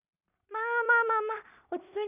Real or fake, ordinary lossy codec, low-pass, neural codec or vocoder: fake; none; 3.6 kHz; codec, 16 kHz in and 24 kHz out, 0.4 kbps, LongCat-Audio-Codec, two codebook decoder